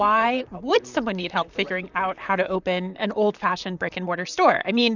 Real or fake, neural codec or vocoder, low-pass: fake; vocoder, 44.1 kHz, 128 mel bands, Pupu-Vocoder; 7.2 kHz